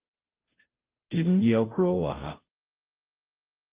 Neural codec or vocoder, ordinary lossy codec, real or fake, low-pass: codec, 16 kHz, 0.5 kbps, FunCodec, trained on Chinese and English, 25 frames a second; Opus, 32 kbps; fake; 3.6 kHz